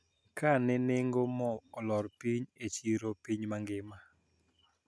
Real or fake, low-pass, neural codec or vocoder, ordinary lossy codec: real; none; none; none